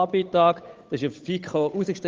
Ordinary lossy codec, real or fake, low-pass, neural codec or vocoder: Opus, 32 kbps; fake; 7.2 kHz; codec, 16 kHz, 8 kbps, FunCodec, trained on Chinese and English, 25 frames a second